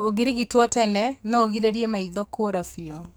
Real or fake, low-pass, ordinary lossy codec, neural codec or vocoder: fake; none; none; codec, 44.1 kHz, 2.6 kbps, SNAC